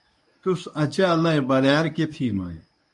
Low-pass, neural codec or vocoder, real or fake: 10.8 kHz; codec, 24 kHz, 0.9 kbps, WavTokenizer, medium speech release version 1; fake